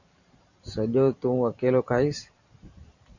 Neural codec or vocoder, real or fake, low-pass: vocoder, 44.1 kHz, 128 mel bands every 512 samples, BigVGAN v2; fake; 7.2 kHz